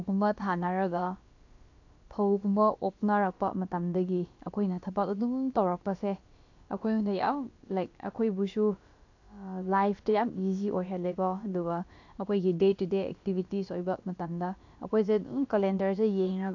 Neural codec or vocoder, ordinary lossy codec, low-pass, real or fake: codec, 16 kHz, about 1 kbps, DyCAST, with the encoder's durations; AAC, 48 kbps; 7.2 kHz; fake